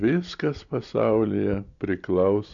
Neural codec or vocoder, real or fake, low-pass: none; real; 7.2 kHz